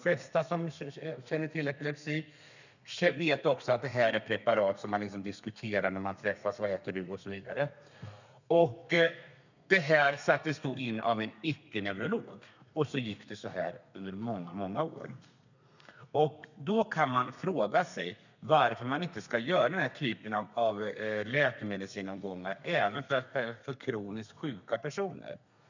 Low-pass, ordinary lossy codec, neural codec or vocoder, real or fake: 7.2 kHz; none; codec, 32 kHz, 1.9 kbps, SNAC; fake